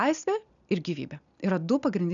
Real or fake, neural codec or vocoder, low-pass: real; none; 7.2 kHz